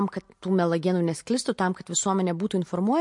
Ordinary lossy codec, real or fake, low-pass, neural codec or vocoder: MP3, 48 kbps; real; 10.8 kHz; none